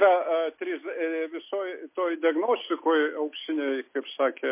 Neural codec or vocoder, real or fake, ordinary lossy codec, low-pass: none; real; MP3, 24 kbps; 3.6 kHz